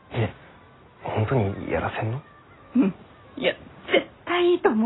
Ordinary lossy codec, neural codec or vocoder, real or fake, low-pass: AAC, 16 kbps; none; real; 7.2 kHz